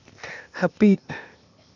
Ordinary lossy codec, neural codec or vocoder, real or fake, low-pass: none; codec, 16 kHz, 0.8 kbps, ZipCodec; fake; 7.2 kHz